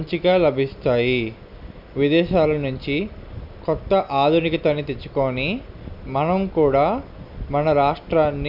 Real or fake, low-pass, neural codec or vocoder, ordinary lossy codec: real; 5.4 kHz; none; none